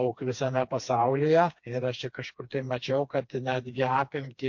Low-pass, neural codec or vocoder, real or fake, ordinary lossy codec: 7.2 kHz; codec, 16 kHz, 2 kbps, FreqCodec, smaller model; fake; MP3, 48 kbps